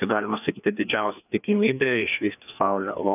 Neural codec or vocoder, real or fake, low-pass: codec, 16 kHz, 2 kbps, FreqCodec, larger model; fake; 3.6 kHz